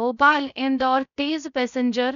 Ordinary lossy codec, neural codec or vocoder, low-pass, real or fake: none; codec, 16 kHz, 0.3 kbps, FocalCodec; 7.2 kHz; fake